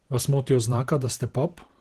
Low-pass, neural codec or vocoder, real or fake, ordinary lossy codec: 14.4 kHz; vocoder, 44.1 kHz, 128 mel bands every 512 samples, BigVGAN v2; fake; Opus, 16 kbps